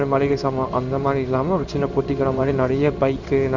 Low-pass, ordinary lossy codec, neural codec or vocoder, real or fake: 7.2 kHz; none; codec, 16 kHz in and 24 kHz out, 1 kbps, XY-Tokenizer; fake